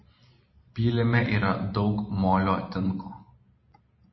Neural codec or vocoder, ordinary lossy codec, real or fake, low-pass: none; MP3, 24 kbps; real; 7.2 kHz